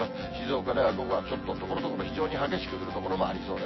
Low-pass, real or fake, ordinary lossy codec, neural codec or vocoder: 7.2 kHz; fake; MP3, 24 kbps; vocoder, 24 kHz, 100 mel bands, Vocos